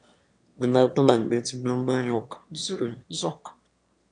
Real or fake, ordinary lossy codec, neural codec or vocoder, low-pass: fake; MP3, 96 kbps; autoencoder, 22.05 kHz, a latent of 192 numbers a frame, VITS, trained on one speaker; 9.9 kHz